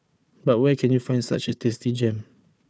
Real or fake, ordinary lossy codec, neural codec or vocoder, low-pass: fake; none; codec, 16 kHz, 4 kbps, FunCodec, trained on Chinese and English, 50 frames a second; none